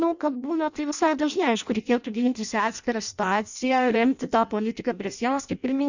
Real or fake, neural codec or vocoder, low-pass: fake; codec, 16 kHz in and 24 kHz out, 0.6 kbps, FireRedTTS-2 codec; 7.2 kHz